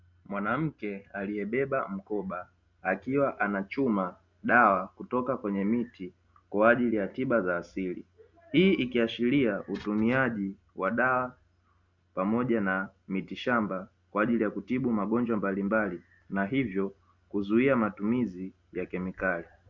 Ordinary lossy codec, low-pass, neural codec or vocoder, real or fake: Opus, 64 kbps; 7.2 kHz; none; real